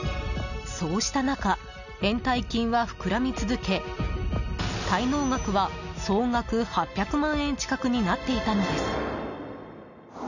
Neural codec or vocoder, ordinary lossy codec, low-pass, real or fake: none; none; 7.2 kHz; real